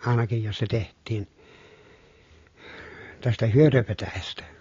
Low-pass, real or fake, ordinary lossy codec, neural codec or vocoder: 7.2 kHz; real; AAC, 32 kbps; none